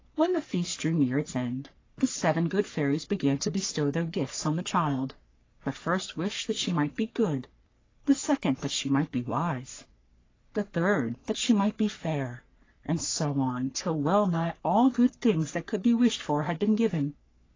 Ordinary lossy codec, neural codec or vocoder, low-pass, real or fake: AAC, 32 kbps; codec, 44.1 kHz, 3.4 kbps, Pupu-Codec; 7.2 kHz; fake